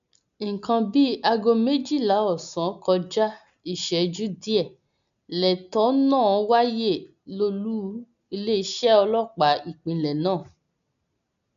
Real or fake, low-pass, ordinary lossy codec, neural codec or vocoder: real; 7.2 kHz; none; none